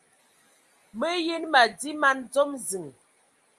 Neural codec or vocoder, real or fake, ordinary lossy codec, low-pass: none; real; Opus, 32 kbps; 10.8 kHz